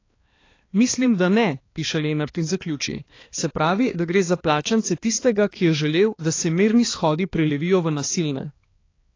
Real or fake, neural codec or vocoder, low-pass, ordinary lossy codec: fake; codec, 16 kHz, 2 kbps, X-Codec, HuBERT features, trained on balanced general audio; 7.2 kHz; AAC, 32 kbps